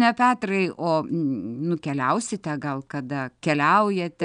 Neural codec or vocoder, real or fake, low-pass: none; real; 9.9 kHz